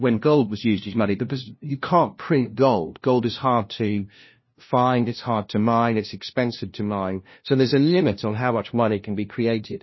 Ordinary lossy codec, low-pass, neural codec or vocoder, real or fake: MP3, 24 kbps; 7.2 kHz; codec, 16 kHz, 0.5 kbps, FunCodec, trained on LibriTTS, 25 frames a second; fake